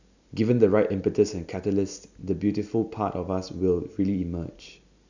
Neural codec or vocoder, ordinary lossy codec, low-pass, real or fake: none; none; 7.2 kHz; real